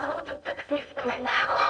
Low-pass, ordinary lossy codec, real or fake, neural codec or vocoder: 9.9 kHz; AAC, 64 kbps; fake; codec, 16 kHz in and 24 kHz out, 0.8 kbps, FocalCodec, streaming, 65536 codes